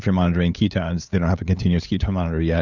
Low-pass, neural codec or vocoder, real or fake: 7.2 kHz; codec, 16 kHz, 8 kbps, FunCodec, trained on LibriTTS, 25 frames a second; fake